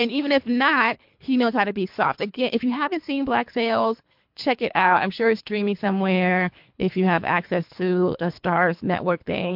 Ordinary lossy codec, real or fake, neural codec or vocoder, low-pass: MP3, 48 kbps; fake; codec, 24 kHz, 3 kbps, HILCodec; 5.4 kHz